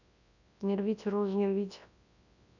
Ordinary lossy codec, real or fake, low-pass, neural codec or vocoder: none; fake; 7.2 kHz; codec, 24 kHz, 0.9 kbps, WavTokenizer, large speech release